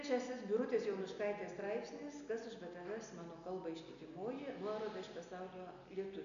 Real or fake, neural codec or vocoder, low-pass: real; none; 7.2 kHz